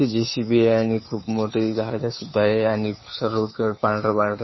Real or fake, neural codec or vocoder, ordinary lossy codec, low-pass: fake; codec, 16 kHz, 2 kbps, FunCodec, trained on Chinese and English, 25 frames a second; MP3, 24 kbps; 7.2 kHz